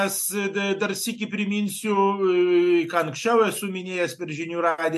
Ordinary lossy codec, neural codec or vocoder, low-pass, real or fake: MP3, 64 kbps; none; 14.4 kHz; real